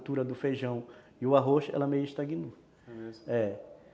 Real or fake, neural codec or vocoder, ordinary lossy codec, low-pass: real; none; none; none